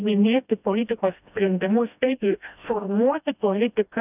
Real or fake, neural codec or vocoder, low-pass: fake; codec, 16 kHz, 1 kbps, FreqCodec, smaller model; 3.6 kHz